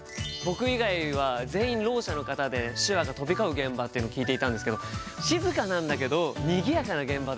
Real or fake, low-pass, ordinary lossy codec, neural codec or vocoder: real; none; none; none